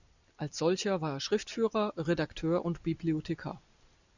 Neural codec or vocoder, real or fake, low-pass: none; real; 7.2 kHz